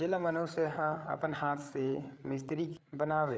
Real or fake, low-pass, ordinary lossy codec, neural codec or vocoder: fake; none; none; codec, 16 kHz, 8 kbps, FreqCodec, larger model